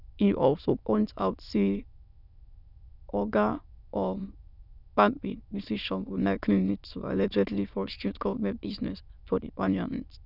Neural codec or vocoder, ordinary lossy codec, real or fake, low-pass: autoencoder, 22.05 kHz, a latent of 192 numbers a frame, VITS, trained on many speakers; none; fake; 5.4 kHz